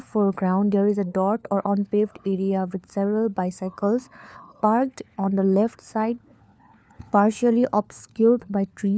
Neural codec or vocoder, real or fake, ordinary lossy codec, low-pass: codec, 16 kHz, 4 kbps, FunCodec, trained on LibriTTS, 50 frames a second; fake; none; none